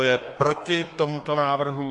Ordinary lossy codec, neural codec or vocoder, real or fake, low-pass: AAC, 48 kbps; codec, 24 kHz, 1 kbps, SNAC; fake; 10.8 kHz